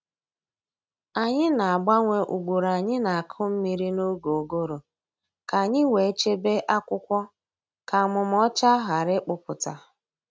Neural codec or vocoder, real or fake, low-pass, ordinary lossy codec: none; real; none; none